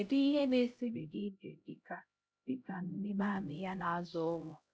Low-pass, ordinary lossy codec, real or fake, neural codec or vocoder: none; none; fake; codec, 16 kHz, 0.5 kbps, X-Codec, HuBERT features, trained on LibriSpeech